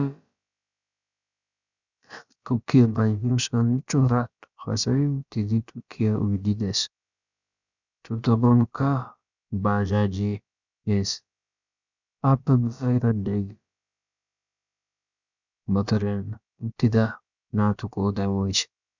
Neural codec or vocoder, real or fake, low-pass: codec, 16 kHz, about 1 kbps, DyCAST, with the encoder's durations; fake; 7.2 kHz